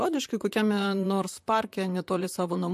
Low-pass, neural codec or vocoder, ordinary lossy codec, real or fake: 14.4 kHz; vocoder, 44.1 kHz, 128 mel bands, Pupu-Vocoder; MP3, 64 kbps; fake